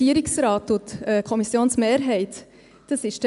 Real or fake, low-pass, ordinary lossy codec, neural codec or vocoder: real; 10.8 kHz; AAC, 96 kbps; none